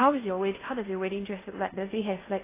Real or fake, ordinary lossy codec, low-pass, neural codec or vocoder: fake; AAC, 16 kbps; 3.6 kHz; codec, 16 kHz in and 24 kHz out, 0.6 kbps, FocalCodec, streaming, 4096 codes